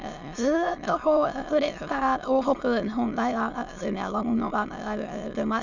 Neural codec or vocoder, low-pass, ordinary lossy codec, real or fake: autoencoder, 22.05 kHz, a latent of 192 numbers a frame, VITS, trained on many speakers; 7.2 kHz; none; fake